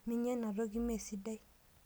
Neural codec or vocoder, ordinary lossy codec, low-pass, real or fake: none; none; none; real